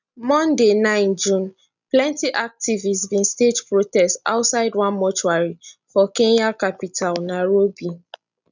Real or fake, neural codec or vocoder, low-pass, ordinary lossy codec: real; none; 7.2 kHz; none